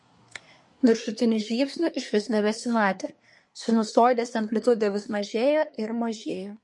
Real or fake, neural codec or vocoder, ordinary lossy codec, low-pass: fake; codec, 24 kHz, 1 kbps, SNAC; MP3, 48 kbps; 10.8 kHz